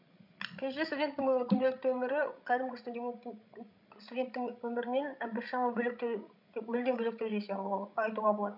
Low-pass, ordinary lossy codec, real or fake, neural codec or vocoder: 5.4 kHz; none; fake; codec, 16 kHz, 16 kbps, FreqCodec, larger model